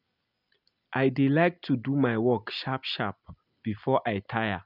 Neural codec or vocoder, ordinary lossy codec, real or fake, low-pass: none; none; real; 5.4 kHz